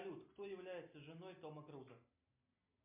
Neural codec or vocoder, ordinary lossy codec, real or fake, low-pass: none; MP3, 32 kbps; real; 3.6 kHz